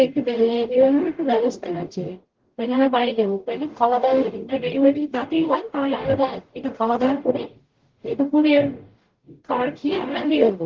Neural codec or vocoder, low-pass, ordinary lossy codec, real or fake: codec, 44.1 kHz, 0.9 kbps, DAC; 7.2 kHz; Opus, 16 kbps; fake